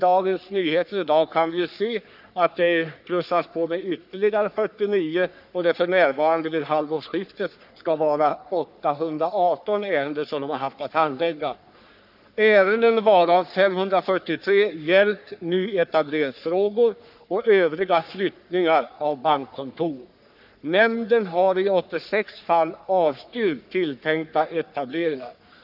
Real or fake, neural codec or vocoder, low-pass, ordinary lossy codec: fake; codec, 44.1 kHz, 3.4 kbps, Pupu-Codec; 5.4 kHz; none